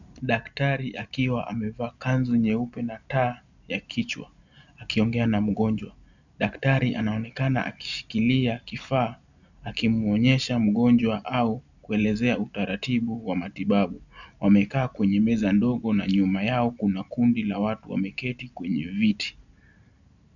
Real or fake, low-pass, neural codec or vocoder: real; 7.2 kHz; none